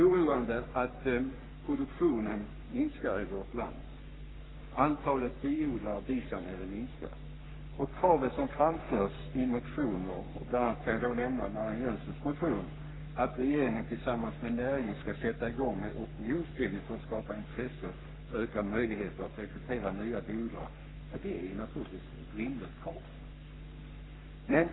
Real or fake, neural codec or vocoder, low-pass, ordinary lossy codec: fake; codec, 44.1 kHz, 3.4 kbps, Pupu-Codec; 7.2 kHz; AAC, 16 kbps